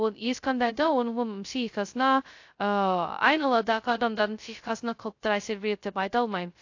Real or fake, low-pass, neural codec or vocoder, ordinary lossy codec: fake; 7.2 kHz; codec, 16 kHz, 0.2 kbps, FocalCodec; AAC, 48 kbps